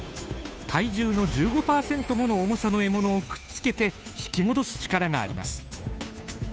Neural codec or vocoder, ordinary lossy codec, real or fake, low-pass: codec, 16 kHz, 2 kbps, FunCodec, trained on Chinese and English, 25 frames a second; none; fake; none